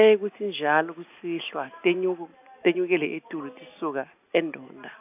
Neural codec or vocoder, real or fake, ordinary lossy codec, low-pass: none; real; AAC, 32 kbps; 3.6 kHz